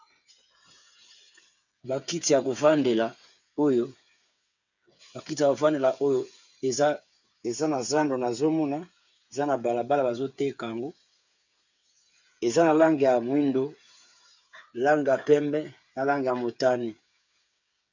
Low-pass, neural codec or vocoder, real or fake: 7.2 kHz; codec, 16 kHz, 8 kbps, FreqCodec, smaller model; fake